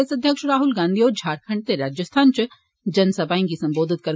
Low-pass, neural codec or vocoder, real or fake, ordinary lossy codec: none; none; real; none